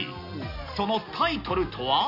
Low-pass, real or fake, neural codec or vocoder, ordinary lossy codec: 5.4 kHz; real; none; none